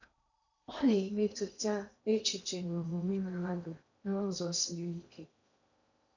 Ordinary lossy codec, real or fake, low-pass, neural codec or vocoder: none; fake; 7.2 kHz; codec, 16 kHz in and 24 kHz out, 0.8 kbps, FocalCodec, streaming, 65536 codes